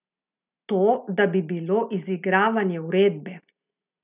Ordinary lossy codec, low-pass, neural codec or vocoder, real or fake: none; 3.6 kHz; none; real